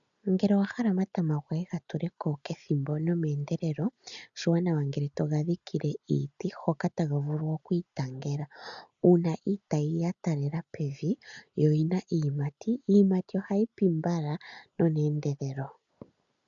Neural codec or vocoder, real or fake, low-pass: none; real; 7.2 kHz